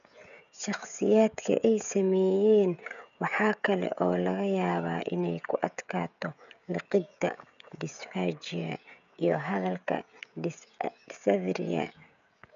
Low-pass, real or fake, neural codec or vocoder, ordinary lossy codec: 7.2 kHz; fake; codec, 16 kHz, 16 kbps, FreqCodec, smaller model; none